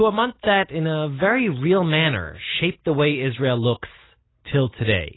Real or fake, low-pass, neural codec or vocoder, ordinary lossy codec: real; 7.2 kHz; none; AAC, 16 kbps